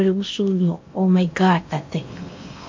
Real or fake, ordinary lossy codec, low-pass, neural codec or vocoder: fake; MP3, 48 kbps; 7.2 kHz; codec, 16 kHz in and 24 kHz out, 0.8 kbps, FocalCodec, streaming, 65536 codes